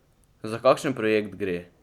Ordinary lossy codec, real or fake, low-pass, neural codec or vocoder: none; real; 19.8 kHz; none